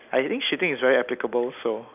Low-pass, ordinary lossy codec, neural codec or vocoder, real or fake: 3.6 kHz; none; none; real